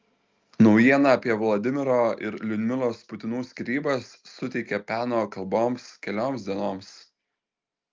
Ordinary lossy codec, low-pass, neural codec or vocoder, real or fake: Opus, 24 kbps; 7.2 kHz; none; real